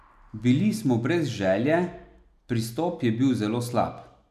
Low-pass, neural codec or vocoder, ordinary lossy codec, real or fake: 14.4 kHz; none; none; real